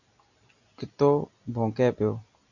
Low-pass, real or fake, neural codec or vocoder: 7.2 kHz; real; none